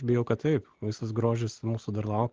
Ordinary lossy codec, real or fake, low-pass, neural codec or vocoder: Opus, 16 kbps; real; 7.2 kHz; none